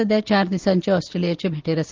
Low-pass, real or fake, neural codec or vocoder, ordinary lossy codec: 7.2 kHz; real; none; Opus, 24 kbps